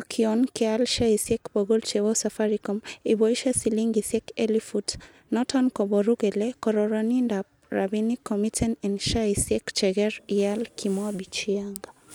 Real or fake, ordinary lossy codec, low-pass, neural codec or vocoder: real; none; none; none